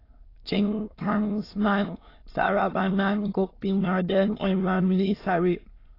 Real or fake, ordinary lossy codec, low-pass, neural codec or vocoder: fake; AAC, 24 kbps; 5.4 kHz; autoencoder, 22.05 kHz, a latent of 192 numbers a frame, VITS, trained on many speakers